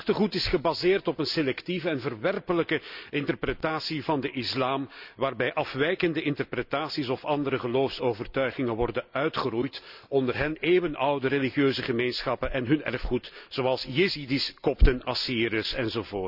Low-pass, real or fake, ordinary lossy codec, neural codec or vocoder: 5.4 kHz; real; none; none